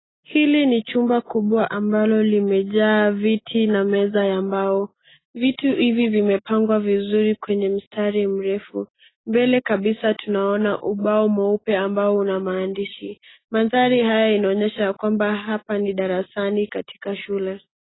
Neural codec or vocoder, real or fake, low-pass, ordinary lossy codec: none; real; 7.2 kHz; AAC, 16 kbps